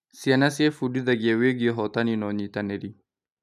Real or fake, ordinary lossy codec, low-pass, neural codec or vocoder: real; none; 14.4 kHz; none